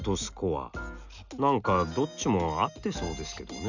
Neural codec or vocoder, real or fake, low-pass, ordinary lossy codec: none; real; 7.2 kHz; none